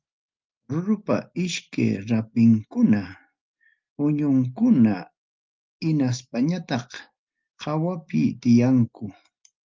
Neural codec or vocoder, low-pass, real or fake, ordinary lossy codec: none; 7.2 kHz; real; Opus, 32 kbps